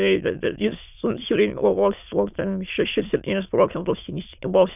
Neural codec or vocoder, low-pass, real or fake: autoencoder, 22.05 kHz, a latent of 192 numbers a frame, VITS, trained on many speakers; 3.6 kHz; fake